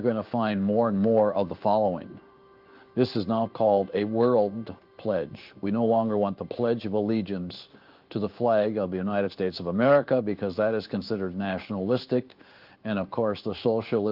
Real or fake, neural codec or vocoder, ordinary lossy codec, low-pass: fake; codec, 16 kHz in and 24 kHz out, 1 kbps, XY-Tokenizer; Opus, 24 kbps; 5.4 kHz